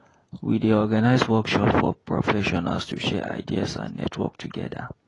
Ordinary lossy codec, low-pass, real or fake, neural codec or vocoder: AAC, 32 kbps; 10.8 kHz; fake; vocoder, 48 kHz, 128 mel bands, Vocos